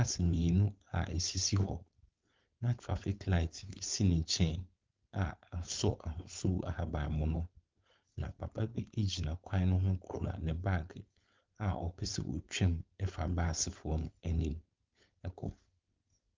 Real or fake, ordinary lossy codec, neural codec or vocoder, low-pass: fake; Opus, 24 kbps; codec, 16 kHz, 4.8 kbps, FACodec; 7.2 kHz